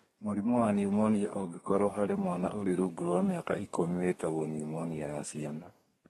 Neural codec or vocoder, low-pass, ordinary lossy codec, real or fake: codec, 32 kHz, 1.9 kbps, SNAC; 14.4 kHz; AAC, 32 kbps; fake